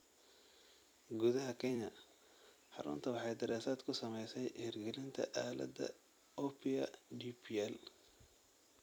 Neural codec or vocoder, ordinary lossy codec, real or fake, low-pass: vocoder, 44.1 kHz, 128 mel bands every 256 samples, BigVGAN v2; none; fake; none